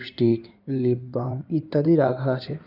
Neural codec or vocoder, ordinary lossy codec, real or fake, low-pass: codec, 16 kHz in and 24 kHz out, 2.2 kbps, FireRedTTS-2 codec; none; fake; 5.4 kHz